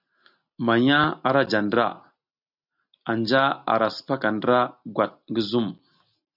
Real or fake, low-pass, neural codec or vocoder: real; 5.4 kHz; none